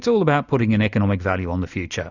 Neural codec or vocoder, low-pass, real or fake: none; 7.2 kHz; real